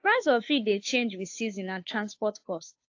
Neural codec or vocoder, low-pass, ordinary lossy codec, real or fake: codec, 16 kHz, 2 kbps, FunCodec, trained on Chinese and English, 25 frames a second; 7.2 kHz; AAC, 48 kbps; fake